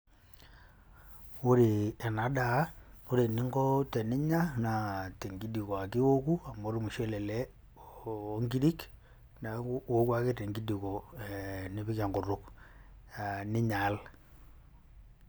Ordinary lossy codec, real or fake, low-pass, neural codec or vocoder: none; real; none; none